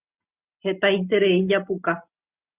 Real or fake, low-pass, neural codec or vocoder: real; 3.6 kHz; none